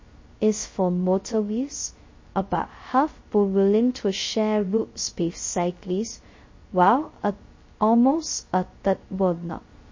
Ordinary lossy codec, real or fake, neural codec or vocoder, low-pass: MP3, 32 kbps; fake; codec, 16 kHz, 0.2 kbps, FocalCodec; 7.2 kHz